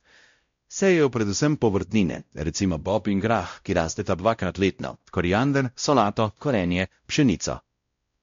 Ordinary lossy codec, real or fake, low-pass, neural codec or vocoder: MP3, 48 kbps; fake; 7.2 kHz; codec, 16 kHz, 0.5 kbps, X-Codec, WavLM features, trained on Multilingual LibriSpeech